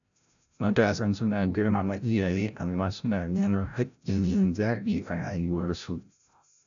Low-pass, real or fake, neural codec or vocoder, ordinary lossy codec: 7.2 kHz; fake; codec, 16 kHz, 0.5 kbps, FreqCodec, larger model; none